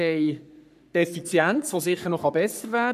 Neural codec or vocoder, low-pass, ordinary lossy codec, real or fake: codec, 44.1 kHz, 3.4 kbps, Pupu-Codec; 14.4 kHz; none; fake